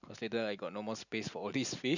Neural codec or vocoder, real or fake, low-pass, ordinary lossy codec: none; real; 7.2 kHz; none